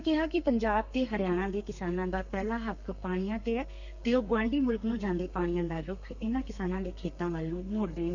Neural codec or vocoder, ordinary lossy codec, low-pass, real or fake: codec, 32 kHz, 1.9 kbps, SNAC; none; 7.2 kHz; fake